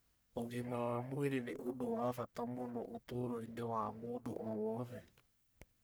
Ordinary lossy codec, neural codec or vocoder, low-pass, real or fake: none; codec, 44.1 kHz, 1.7 kbps, Pupu-Codec; none; fake